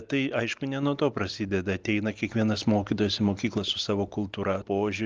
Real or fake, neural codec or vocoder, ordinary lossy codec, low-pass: real; none; Opus, 32 kbps; 7.2 kHz